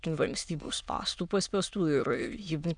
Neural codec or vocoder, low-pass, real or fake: autoencoder, 22.05 kHz, a latent of 192 numbers a frame, VITS, trained on many speakers; 9.9 kHz; fake